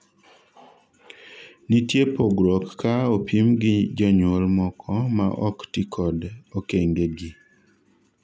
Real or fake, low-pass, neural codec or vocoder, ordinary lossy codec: real; none; none; none